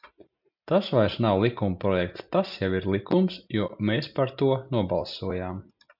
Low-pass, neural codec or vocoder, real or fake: 5.4 kHz; none; real